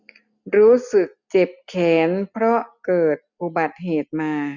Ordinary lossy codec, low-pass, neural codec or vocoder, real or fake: none; 7.2 kHz; none; real